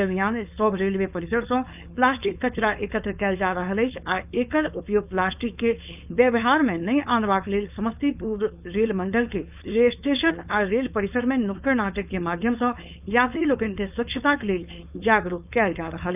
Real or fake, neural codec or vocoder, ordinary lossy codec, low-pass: fake; codec, 16 kHz, 4.8 kbps, FACodec; none; 3.6 kHz